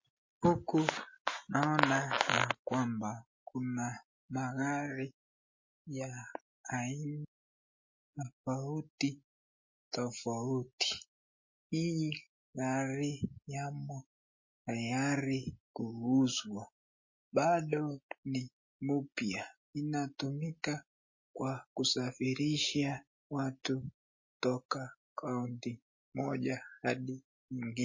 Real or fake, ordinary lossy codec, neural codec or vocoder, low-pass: real; MP3, 32 kbps; none; 7.2 kHz